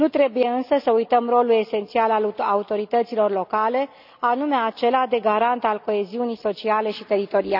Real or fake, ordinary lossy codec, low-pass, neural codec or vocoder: real; none; 5.4 kHz; none